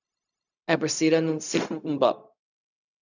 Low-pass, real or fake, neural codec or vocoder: 7.2 kHz; fake; codec, 16 kHz, 0.4 kbps, LongCat-Audio-Codec